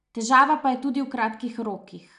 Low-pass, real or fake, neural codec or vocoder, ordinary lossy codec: 10.8 kHz; real; none; none